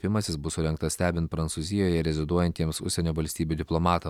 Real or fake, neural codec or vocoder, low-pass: real; none; 19.8 kHz